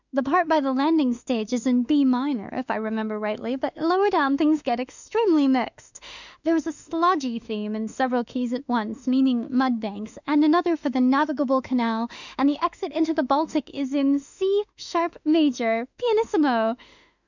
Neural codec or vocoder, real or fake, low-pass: autoencoder, 48 kHz, 32 numbers a frame, DAC-VAE, trained on Japanese speech; fake; 7.2 kHz